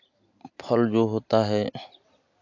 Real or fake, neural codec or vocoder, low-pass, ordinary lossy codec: real; none; 7.2 kHz; AAC, 48 kbps